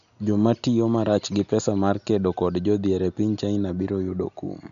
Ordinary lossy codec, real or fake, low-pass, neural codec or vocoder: none; real; 7.2 kHz; none